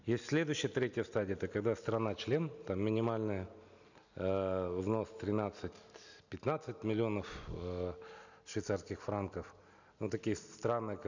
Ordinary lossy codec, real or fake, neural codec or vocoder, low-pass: none; real; none; 7.2 kHz